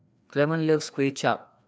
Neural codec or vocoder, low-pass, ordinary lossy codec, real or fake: codec, 16 kHz, 2 kbps, FreqCodec, larger model; none; none; fake